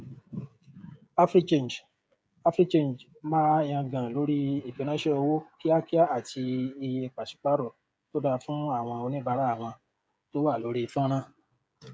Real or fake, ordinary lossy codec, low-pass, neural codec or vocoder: fake; none; none; codec, 16 kHz, 16 kbps, FreqCodec, smaller model